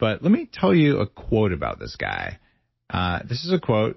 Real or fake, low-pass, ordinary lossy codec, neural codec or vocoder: real; 7.2 kHz; MP3, 24 kbps; none